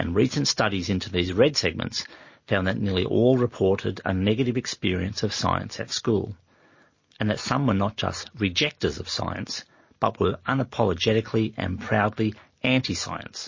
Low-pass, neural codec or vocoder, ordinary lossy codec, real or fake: 7.2 kHz; none; MP3, 32 kbps; real